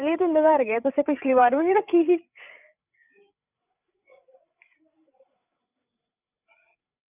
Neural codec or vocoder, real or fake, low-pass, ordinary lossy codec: codec, 16 kHz, 8 kbps, FreqCodec, larger model; fake; 3.6 kHz; none